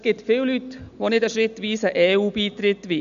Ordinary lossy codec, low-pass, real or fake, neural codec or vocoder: none; 7.2 kHz; real; none